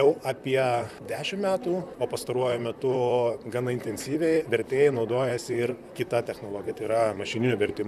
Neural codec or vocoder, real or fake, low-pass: vocoder, 44.1 kHz, 128 mel bands, Pupu-Vocoder; fake; 14.4 kHz